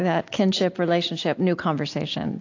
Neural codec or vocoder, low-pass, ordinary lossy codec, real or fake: none; 7.2 kHz; AAC, 48 kbps; real